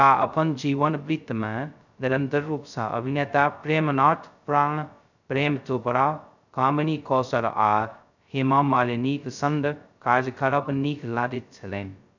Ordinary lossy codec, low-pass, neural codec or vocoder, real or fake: none; 7.2 kHz; codec, 16 kHz, 0.2 kbps, FocalCodec; fake